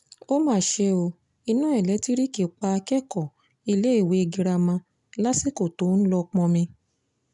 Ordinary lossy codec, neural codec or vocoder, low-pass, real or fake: none; none; 10.8 kHz; real